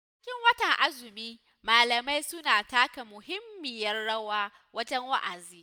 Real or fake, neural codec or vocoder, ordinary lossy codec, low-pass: real; none; none; none